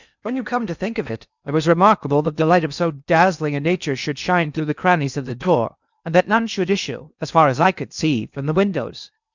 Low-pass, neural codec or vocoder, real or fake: 7.2 kHz; codec, 16 kHz in and 24 kHz out, 0.8 kbps, FocalCodec, streaming, 65536 codes; fake